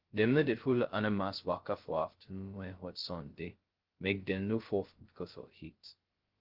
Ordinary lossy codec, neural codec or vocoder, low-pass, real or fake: Opus, 16 kbps; codec, 16 kHz, 0.2 kbps, FocalCodec; 5.4 kHz; fake